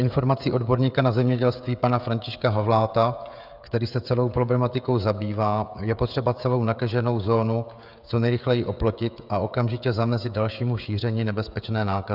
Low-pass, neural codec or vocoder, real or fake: 5.4 kHz; codec, 16 kHz, 16 kbps, FreqCodec, smaller model; fake